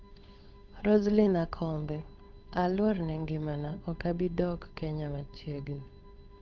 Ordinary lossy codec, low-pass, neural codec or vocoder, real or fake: MP3, 64 kbps; 7.2 kHz; codec, 16 kHz, 8 kbps, FunCodec, trained on Chinese and English, 25 frames a second; fake